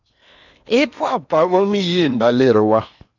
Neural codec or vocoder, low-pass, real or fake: codec, 16 kHz in and 24 kHz out, 0.8 kbps, FocalCodec, streaming, 65536 codes; 7.2 kHz; fake